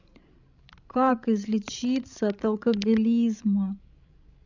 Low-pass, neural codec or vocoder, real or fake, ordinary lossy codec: 7.2 kHz; codec, 16 kHz, 16 kbps, FreqCodec, larger model; fake; none